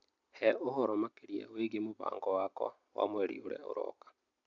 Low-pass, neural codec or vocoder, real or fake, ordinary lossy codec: 7.2 kHz; none; real; AAC, 64 kbps